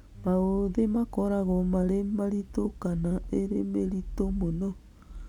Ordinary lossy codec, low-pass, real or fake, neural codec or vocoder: none; 19.8 kHz; real; none